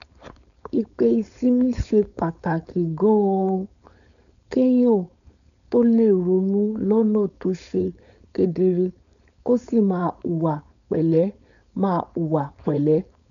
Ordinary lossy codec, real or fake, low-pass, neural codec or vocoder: none; fake; 7.2 kHz; codec, 16 kHz, 4.8 kbps, FACodec